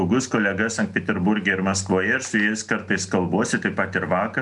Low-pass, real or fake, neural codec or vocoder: 10.8 kHz; real; none